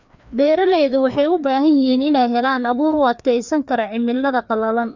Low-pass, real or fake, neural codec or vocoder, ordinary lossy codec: 7.2 kHz; fake; codec, 16 kHz, 2 kbps, FreqCodec, larger model; none